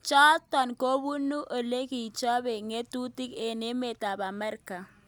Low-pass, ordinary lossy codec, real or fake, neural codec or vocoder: none; none; real; none